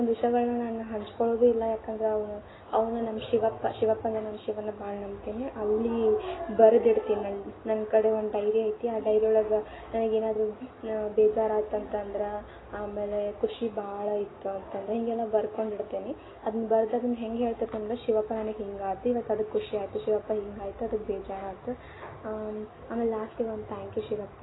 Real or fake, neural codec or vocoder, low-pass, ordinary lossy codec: real; none; 7.2 kHz; AAC, 16 kbps